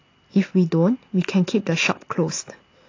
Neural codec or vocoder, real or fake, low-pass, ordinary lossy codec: none; real; 7.2 kHz; AAC, 32 kbps